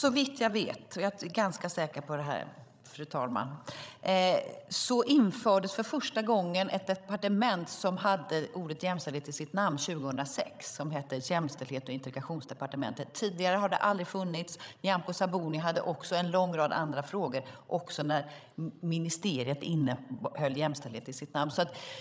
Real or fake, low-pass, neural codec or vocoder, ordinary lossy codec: fake; none; codec, 16 kHz, 16 kbps, FreqCodec, larger model; none